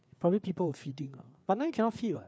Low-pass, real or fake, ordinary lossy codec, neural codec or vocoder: none; fake; none; codec, 16 kHz, 4 kbps, FreqCodec, larger model